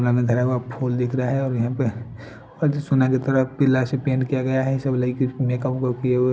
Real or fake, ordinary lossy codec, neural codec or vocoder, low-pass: real; none; none; none